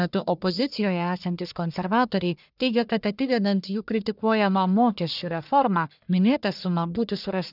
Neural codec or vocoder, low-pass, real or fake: codec, 44.1 kHz, 1.7 kbps, Pupu-Codec; 5.4 kHz; fake